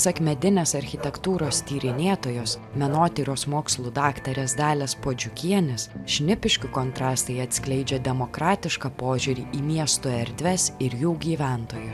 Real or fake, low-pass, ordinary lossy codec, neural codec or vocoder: real; 14.4 kHz; AAC, 96 kbps; none